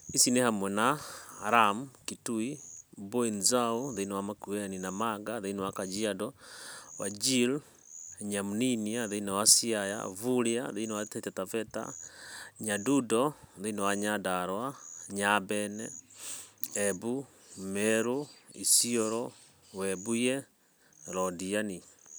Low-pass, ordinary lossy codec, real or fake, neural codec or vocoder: none; none; real; none